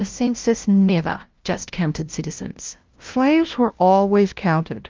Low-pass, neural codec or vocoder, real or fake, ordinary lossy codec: 7.2 kHz; codec, 16 kHz, 0.5 kbps, FunCodec, trained on LibriTTS, 25 frames a second; fake; Opus, 32 kbps